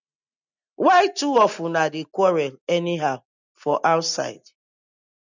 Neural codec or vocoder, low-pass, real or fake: none; 7.2 kHz; real